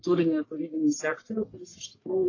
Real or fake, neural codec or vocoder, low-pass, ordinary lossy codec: fake; codec, 44.1 kHz, 1.7 kbps, Pupu-Codec; 7.2 kHz; AAC, 32 kbps